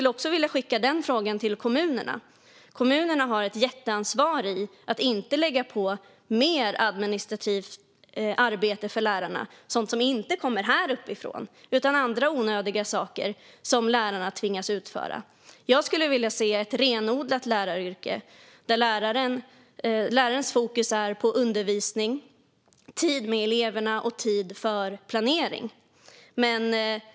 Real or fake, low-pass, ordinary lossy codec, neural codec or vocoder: real; none; none; none